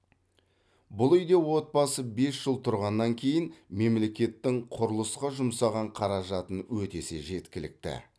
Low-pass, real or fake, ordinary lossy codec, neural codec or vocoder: none; real; none; none